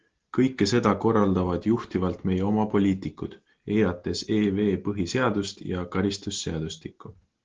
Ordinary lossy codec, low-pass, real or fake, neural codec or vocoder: Opus, 16 kbps; 7.2 kHz; real; none